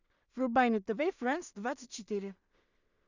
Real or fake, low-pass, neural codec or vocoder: fake; 7.2 kHz; codec, 16 kHz in and 24 kHz out, 0.4 kbps, LongCat-Audio-Codec, two codebook decoder